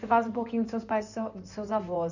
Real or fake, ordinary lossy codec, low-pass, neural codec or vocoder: fake; none; 7.2 kHz; codec, 16 kHz in and 24 kHz out, 2.2 kbps, FireRedTTS-2 codec